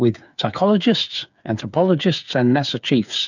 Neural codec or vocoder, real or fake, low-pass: codec, 16 kHz, 8 kbps, FreqCodec, smaller model; fake; 7.2 kHz